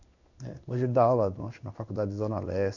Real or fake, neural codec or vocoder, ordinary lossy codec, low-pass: fake; codec, 16 kHz in and 24 kHz out, 1 kbps, XY-Tokenizer; none; 7.2 kHz